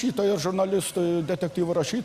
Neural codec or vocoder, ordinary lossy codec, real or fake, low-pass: none; Opus, 64 kbps; real; 14.4 kHz